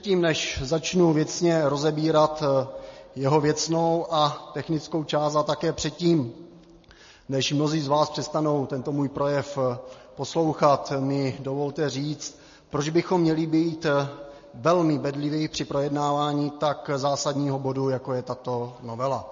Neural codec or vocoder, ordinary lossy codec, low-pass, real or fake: none; MP3, 32 kbps; 7.2 kHz; real